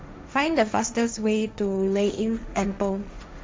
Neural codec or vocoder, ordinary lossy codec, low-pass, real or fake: codec, 16 kHz, 1.1 kbps, Voila-Tokenizer; none; none; fake